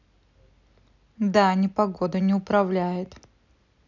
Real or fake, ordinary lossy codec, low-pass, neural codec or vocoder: real; none; 7.2 kHz; none